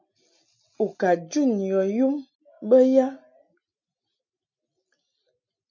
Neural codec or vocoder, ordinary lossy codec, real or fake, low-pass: none; MP3, 64 kbps; real; 7.2 kHz